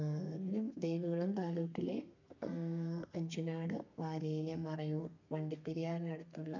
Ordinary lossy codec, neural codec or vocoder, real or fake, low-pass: none; codec, 32 kHz, 1.9 kbps, SNAC; fake; 7.2 kHz